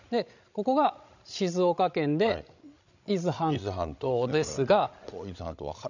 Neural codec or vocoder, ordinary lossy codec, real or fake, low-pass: codec, 16 kHz, 16 kbps, FreqCodec, larger model; none; fake; 7.2 kHz